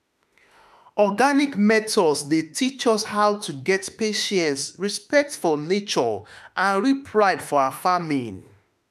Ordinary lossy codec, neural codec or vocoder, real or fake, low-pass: none; autoencoder, 48 kHz, 32 numbers a frame, DAC-VAE, trained on Japanese speech; fake; 14.4 kHz